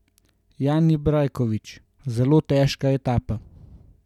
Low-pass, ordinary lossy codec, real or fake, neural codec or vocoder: 19.8 kHz; none; real; none